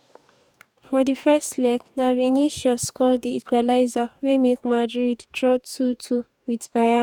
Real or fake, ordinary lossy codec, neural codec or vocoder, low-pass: fake; none; codec, 44.1 kHz, 2.6 kbps, DAC; 19.8 kHz